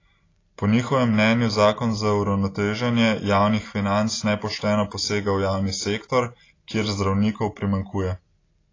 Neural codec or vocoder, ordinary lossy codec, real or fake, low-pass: none; AAC, 32 kbps; real; 7.2 kHz